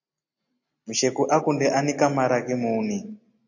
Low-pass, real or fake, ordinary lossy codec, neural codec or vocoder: 7.2 kHz; real; AAC, 48 kbps; none